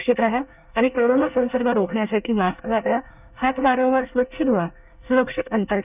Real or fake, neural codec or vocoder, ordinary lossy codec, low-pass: fake; codec, 24 kHz, 1 kbps, SNAC; none; 3.6 kHz